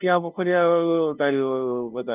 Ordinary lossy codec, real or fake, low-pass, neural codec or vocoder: Opus, 24 kbps; fake; 3.6 kHz; codec, 16 kHz, 0.5 kbps, FunCodec, trained on LibriTTS, 25 frames a second